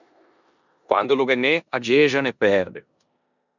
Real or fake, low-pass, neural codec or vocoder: fake; 7.2 kHz; codec, 16 kHz in and 24 kHz out, 0.9 kbps, LongCat-Audio-Codec, four codebook decoder